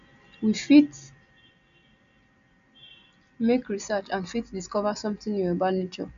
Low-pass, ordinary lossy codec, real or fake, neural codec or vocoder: 7.2 kHz; none; real; none